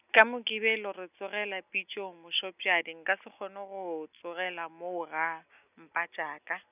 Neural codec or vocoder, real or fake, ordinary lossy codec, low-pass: none; real; none; 3.6 kHz